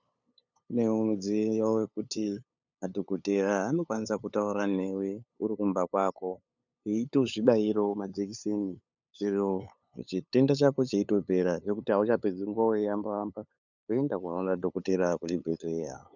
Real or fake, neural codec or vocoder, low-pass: fake; codec, 16 kHz, 8 kbps, FunCodec, trained on LibriTTS, 25 frames a second; 7.2 kHz